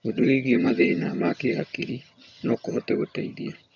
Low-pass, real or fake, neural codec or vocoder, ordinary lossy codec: 7.2 kHz; fake; vocoder, 22.05 kHz, 80 mel bands, HiFi-GAN; none